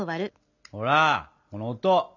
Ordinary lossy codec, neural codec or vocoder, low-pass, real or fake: none; none; 7.2 kHz; real